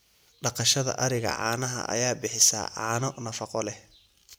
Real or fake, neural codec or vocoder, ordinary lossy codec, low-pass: real; none; none; none